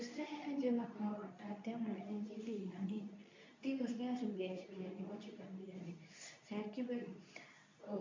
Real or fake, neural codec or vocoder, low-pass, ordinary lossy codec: fake; codec, 24 kHz, 0.9 kbps, WavTokenizer, medium speech release version 2; 7.2 kHz; MP3, 64 kbps